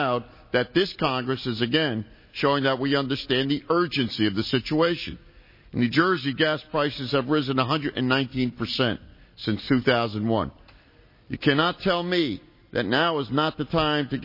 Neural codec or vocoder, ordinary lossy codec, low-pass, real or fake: none; MP3, 24 kbps; 5.4 kHz; real